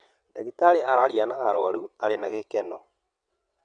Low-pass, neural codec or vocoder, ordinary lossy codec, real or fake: 9.9 kHz; vocoder, 22.05 kHz, 80 mel bands, WaveNeXt; none; fake